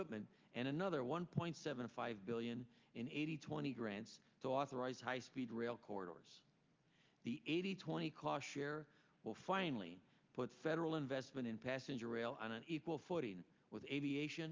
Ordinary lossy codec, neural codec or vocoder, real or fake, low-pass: Opus, 24 kbps; none; real; 7.2 kHz